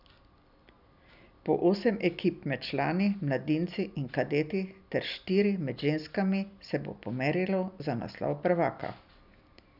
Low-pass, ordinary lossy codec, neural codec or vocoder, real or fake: 5.4 kHz; none; none; real